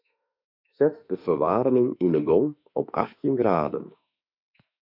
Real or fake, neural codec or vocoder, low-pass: fake; autoencoder, 48 kHz, 32 numbers a frame, DAC-VAE, trained on Japanese speech; 5.4 kHz